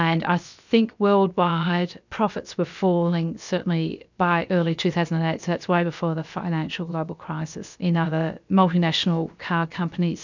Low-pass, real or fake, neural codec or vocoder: 7.2 kHz; fake; codec, 16 kHz, about 1 kbps, DyCAST, with the encoder's durations